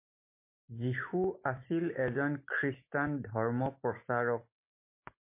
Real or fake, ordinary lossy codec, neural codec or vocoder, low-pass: real; MP3, 24 kbps; none; 3.6 kHz